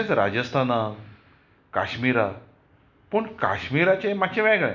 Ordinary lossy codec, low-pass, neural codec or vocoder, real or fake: none; 7.2 kHz; none; real